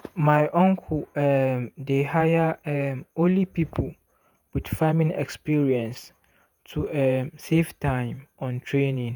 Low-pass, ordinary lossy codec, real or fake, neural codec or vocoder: none; none; fake; vocoder, 48 kHz, 128 mel bands, Vocos